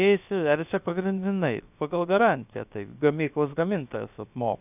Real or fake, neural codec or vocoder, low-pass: fake; codec, 16 kHz, about 1 kbps, DyCAST, with the encoder's durations; 3.6 kHz